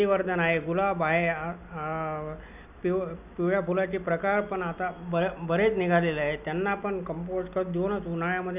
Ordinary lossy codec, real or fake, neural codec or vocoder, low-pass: none; real; none; 3.6 kHz